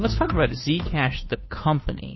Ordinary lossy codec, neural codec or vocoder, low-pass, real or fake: MP3, 24 kbps; codec, 16 kHz, 2 kbps, FunCodec, trained on Chinese and English, 25 frames a second; 7.2 kHz; fake